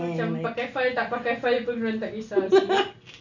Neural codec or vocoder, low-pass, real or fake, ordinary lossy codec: none; 7.2 kHz; real; none